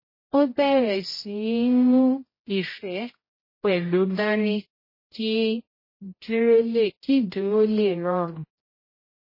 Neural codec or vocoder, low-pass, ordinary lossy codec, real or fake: codec, 16 kHz, 0.5 kbps, X-Codec, HuBERT features, trained on general audio; 5.4 kHz; MP3, 24 kbps; fake